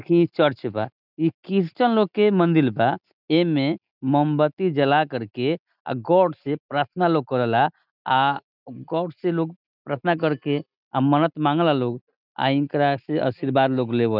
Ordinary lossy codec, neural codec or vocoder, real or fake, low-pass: none; none; real; 5.4 kHz